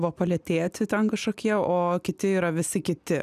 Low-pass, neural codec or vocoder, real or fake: 14.4 kHz; none; real